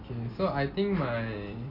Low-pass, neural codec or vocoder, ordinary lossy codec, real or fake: 5.4 kHz; none; none; real